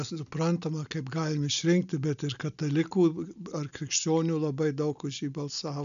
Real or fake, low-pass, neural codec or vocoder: real; 7.2 kHz; none